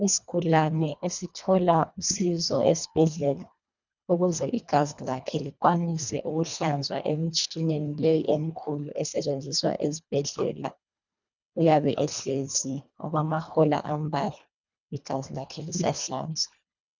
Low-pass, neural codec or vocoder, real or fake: 7.2 kHz; codec, 24 kHz, 1.5 kbps, HILCodec; fake